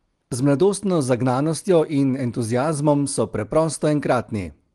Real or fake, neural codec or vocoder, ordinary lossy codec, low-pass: real; none; Opus, 24 kbps; 10.8 kHz